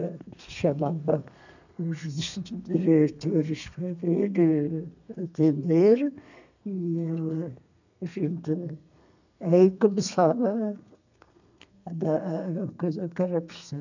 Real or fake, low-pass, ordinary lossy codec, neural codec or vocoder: fake; 7.2 kHz; none; codec, 32 kHz, 1.9 kbps, SNAC